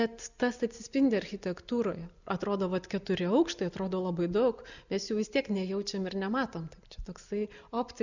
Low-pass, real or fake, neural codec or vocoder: 7.2 kHz; real; none